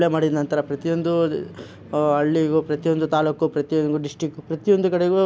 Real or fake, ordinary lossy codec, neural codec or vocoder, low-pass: real; none; none; none